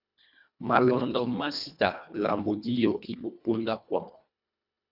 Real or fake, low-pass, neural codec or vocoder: fake; 5.4 kHz; codec, 24 kHz, 1.5 kbps, HILCodec